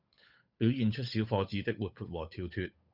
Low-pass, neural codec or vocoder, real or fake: 5.4 kHz; codec, 16 kHz, 8 kbps, FunCodec, trained on Chinese and English, 25 frames a second; fake